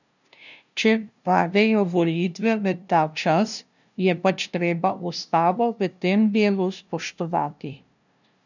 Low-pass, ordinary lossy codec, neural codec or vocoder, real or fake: 7.2 kHz; none; codec, 16 kHz, 0.5 kbps, FunCodec, trained on LibriTTS, 25 frames a second; fake